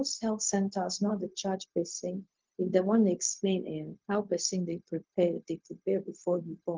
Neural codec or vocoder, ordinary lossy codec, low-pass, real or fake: codec, 16 kHz, 0.4 kbps, LongCat-Audio-Codec; Opus, 16 kbps; 7.2 kHz; fake